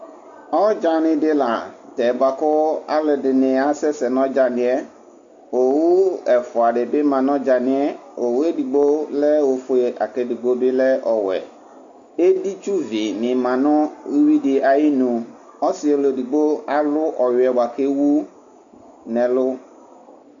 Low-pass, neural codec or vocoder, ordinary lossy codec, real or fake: 7.2 kHz; none; AAC, 64 kbps; real